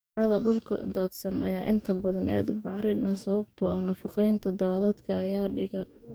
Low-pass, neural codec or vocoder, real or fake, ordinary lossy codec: none; codec, 44.1 kHz, 2.6 kbps, DAC; fake; none